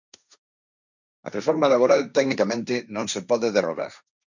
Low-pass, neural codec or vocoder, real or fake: 7.2 kHz; codec, 16 kHz, 1.1 kbps, Voila-Tokenizer; fake